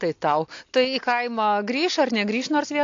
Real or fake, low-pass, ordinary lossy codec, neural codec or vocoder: real; 7.2 kHz; AAC, 48 kbps; none